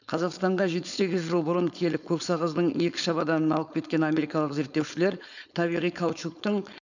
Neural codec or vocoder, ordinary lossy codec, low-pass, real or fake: codec, 16 kHz, 4.8 kbps, FACodec; none; 7.2 kHz; fake